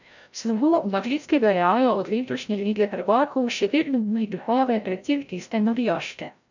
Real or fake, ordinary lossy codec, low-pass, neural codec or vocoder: fake; none; 7.2 kHz; codec, 16 kHz, 0.5 kbps, FreqCodec, larger model